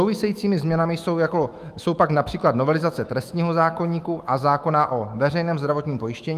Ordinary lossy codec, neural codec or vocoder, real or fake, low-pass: Opus, 32 kbps; autoencoder, 48 kHz, 128 numbers a frame, DAC-VAE, trained on Japanese speech; fake; 14.4 kHz